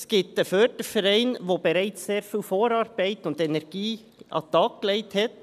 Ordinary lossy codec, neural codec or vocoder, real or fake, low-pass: none; none; real; 14.4 kHz